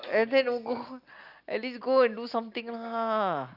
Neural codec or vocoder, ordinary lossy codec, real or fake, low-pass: none; Opus, 64 kbps; real; 5.4 kHz